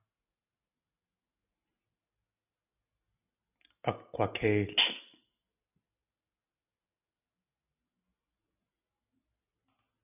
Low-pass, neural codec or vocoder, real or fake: 3.6 kHz; none; real